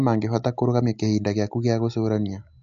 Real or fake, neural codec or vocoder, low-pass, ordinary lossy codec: real; none; 7.2 kHz; AAC, 64 kbps